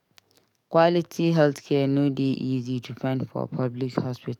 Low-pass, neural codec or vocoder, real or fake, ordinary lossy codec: 19.8 kHz; codec, 44.1 kHz, 7.8 kbps, DAC; fake; none